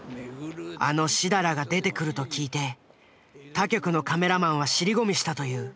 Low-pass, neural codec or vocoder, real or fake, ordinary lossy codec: none; none; real; none